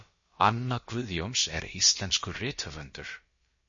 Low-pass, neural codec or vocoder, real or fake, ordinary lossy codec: 7.2 kHz; codec, 16 kHz, about 1 kbps, DyCAST, with the encoder's durations; fake; MP3, 32 kbps